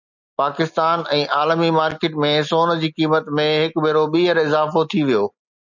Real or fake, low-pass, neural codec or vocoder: real; 7.2 kHz; none